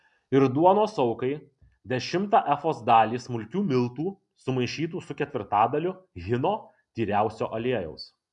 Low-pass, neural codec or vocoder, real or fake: 10.8 kHz; none; real